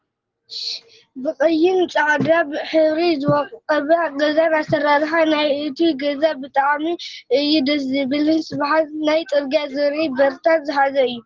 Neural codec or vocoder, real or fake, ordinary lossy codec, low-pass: none; real; Opus, 16 kbps; 7.2 kHz